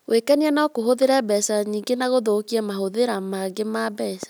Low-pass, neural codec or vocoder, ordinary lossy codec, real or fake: none; none; none; real